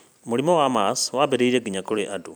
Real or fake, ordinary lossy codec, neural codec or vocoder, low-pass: fake; none; vocoder, 44.1 kHz, 128 mel bands every 256 samples, BigVGAN v2; none